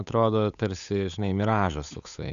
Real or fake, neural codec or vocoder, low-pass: fake; codec, 16 kHz, 8 kbps, FunCodec, trained on Chinese and English, 25 frames a second; 7.2 kHz